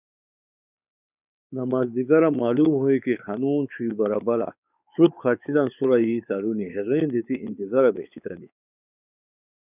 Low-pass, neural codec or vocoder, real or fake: 3.6 kHz; codec, 16 kHz, 4 kbps, X-Codec, WavLM features, trained on Multilingual LibriSpeech; fake